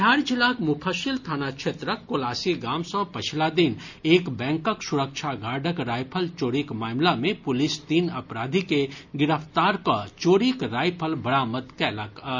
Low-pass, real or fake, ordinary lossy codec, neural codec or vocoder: 7.2 kHz; real; none; none